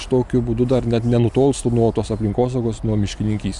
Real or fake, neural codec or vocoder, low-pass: real; none; 10.8 kHz